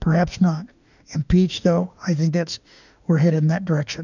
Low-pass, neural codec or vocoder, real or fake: 7.2 kHz; autoencoder, 48 kHz, 32 numbers a frame, DAC-VAE, trained on Japanese speech; fake